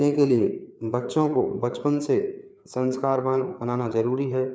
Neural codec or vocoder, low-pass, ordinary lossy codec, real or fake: codec, 16 kHz, 4 kbps, FreqCodec, larger model; none; none; fake